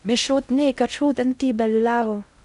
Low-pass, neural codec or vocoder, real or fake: 10.8 kHz; codec, 16 kHz in and 24 kHz out, 0.6 kbps, FocalCodec, streaming, 2048 codes; fake